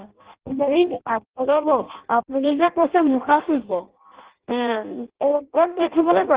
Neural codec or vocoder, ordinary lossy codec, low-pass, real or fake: codec, 16 kHz in and 24 kHz out, 0.6 kbps, FireRedTTS-2 codec; Opus, 16 kbps; 3.6 kHz; fake